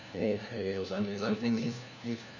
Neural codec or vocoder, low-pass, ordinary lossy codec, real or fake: codec, 16 kHz, 1 kbps, FunCodec, trained on LibriTTS, 50 frames a second; 7.2 kHz; none; fake